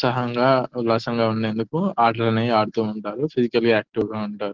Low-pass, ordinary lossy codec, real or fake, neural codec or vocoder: 7.2 kHz; Opus, 16 kbps; real; none